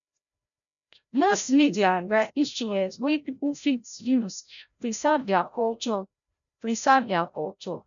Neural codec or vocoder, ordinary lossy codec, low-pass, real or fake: codec, 16 kHz, 0.5 kbps, FreqCodec, larger model; none; 7.2 kHz; fake